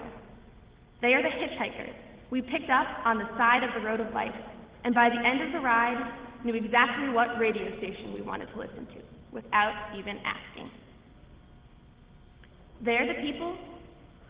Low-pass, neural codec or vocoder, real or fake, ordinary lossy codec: 3.6 kHz; none; real; Opus, 16 kbps